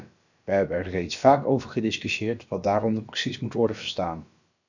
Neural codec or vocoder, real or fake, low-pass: codec, 16 kHz, about 1 kbps, DyCAST, with the encoder's durations; fake; 7.2 kHz